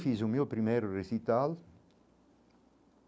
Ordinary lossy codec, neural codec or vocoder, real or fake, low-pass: none; none; real; none